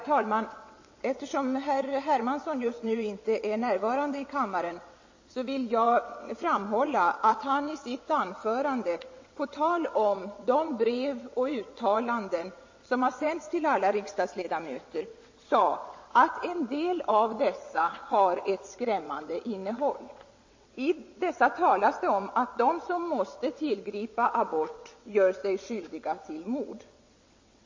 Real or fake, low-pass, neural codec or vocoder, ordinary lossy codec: fake; 7.2 kHz; vocoder, 22.05 kHz, 80 mel bands, WaveNeXt; MP3, 32 kbps